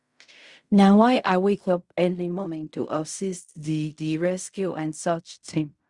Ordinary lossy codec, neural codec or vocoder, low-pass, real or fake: Opus, 64 kbps; codec, 16 kHz in and 24 kHz out, 0.4 kbps, LongCat-Audio-Codec, fine tuned four codebook decoder; 10.8 kHz; fake